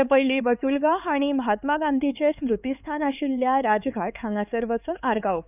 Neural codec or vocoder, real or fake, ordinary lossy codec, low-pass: codec, 16 kHz, 4 kbps, X-Codec, HuBERT features, trained on LibriSpeech; fake; none; 3.6 kHz